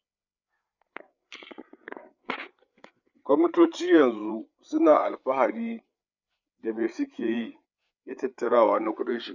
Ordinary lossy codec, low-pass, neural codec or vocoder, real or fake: none; 7.2 kHz; codec, 16 kHz, 8 kbps, FreqCodec, larger model; fake